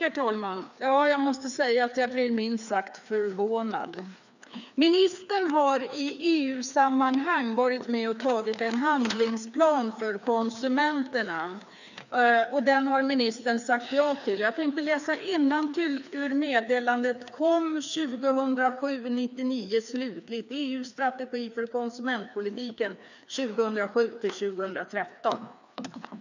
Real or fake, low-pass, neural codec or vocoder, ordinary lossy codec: fake; 7.2 kHz; codec, 16 kHz, 2 kbps, FreqCodec, larger model; none